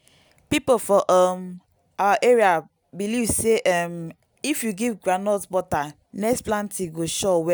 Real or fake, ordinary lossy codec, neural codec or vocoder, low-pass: real; none; none; none